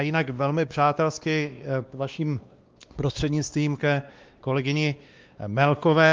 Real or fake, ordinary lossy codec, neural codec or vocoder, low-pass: fake; Opus, 32 kbps; codec, 16 kHz, 2 kbps, X-Codec, WavLM features, trained on Multilingual LibriSpeech; 7.2 kHz